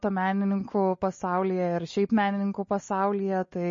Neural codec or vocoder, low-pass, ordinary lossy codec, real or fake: none; 7.2 kHz; MP3, 32 kbps; real